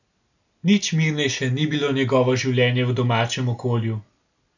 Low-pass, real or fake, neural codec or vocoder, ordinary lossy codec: 7.2 kHz; real; none; none